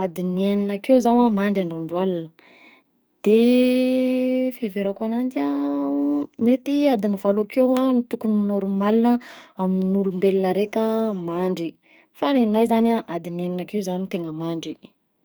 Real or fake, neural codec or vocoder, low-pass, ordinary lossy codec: fake; codec, 44.1 kHz, 2.6 kbps, SNAC; none; none